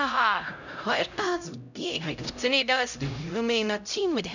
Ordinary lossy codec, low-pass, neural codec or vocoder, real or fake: none; 7.2 kHz; codec, 16 kHz, 0.5 kbps, X-Codec, HuBERT features, trained on LibriSpeech; fake